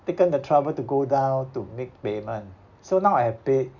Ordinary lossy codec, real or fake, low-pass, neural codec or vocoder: none; real; 7.2 kHz; none